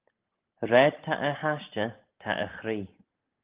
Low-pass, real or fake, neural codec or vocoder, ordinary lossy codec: 3.6 kHz; real; none; Opus, 32 kbps